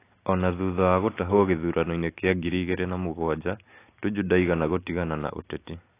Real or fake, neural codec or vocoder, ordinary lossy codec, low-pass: real; none; AAC, 24 kbps; 3.6 kHz